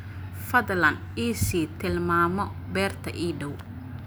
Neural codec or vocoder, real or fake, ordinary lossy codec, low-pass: none; real; none; none